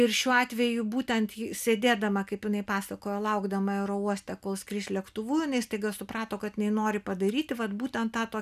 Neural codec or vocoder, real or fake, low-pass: none; real; 14.4 kHz